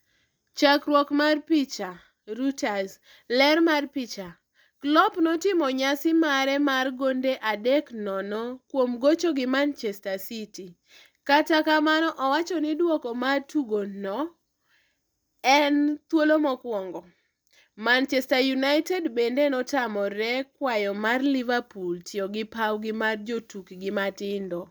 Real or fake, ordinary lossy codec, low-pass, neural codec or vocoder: real; none; none; none